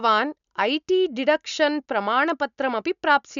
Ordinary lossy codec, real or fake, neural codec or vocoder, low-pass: none; real; none; 7.2 kHz